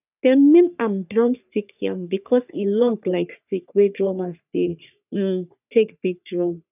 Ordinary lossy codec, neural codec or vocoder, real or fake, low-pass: none; codec, 44.1 kHz, 3.4 kbps, Pupu-Codec; fake; 3.6 kHz